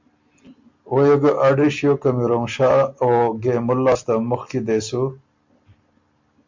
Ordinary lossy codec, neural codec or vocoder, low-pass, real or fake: MP3, 64 kbps; none; 7.2 kHz; real